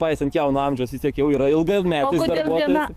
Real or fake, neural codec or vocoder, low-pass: fake; codec, 44.1 kHz, 7.8 kbps, DAC; 14.4 kHz